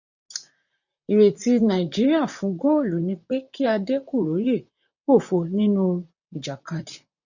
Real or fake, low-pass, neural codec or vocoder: fake; 7.2 kHz; vocoder, 44.1 kHz, 128 mel bands, Pupu-Vocoder